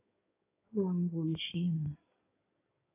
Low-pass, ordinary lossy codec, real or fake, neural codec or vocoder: 3.6 kHz; AAC, 32 kbps; fake; codec, 16 kHz in and 24 kHz out, 2.2 kbps, FireRedTTS-2 codec